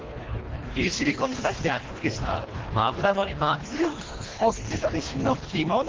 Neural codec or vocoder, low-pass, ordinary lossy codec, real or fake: codec, 24 kHz, 1.5 kbps, HILCodec; 7.2 kHz; Opus, 16 kbps; fake